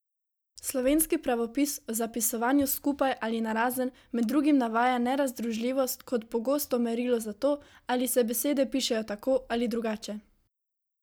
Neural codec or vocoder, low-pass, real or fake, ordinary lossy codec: none; none; real; none